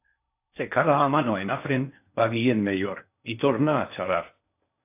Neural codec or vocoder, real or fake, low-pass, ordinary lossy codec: codec, 16 kHz in and 24 kHz out, 0.6 kbps, FocalCodec, streaming, 4096 codes; fake; 3.6 kHz; AAC, 32 kbps